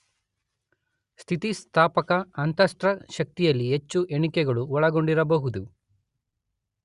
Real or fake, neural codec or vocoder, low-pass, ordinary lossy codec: real; none; 10.8 kHz; Opus, 64 kbps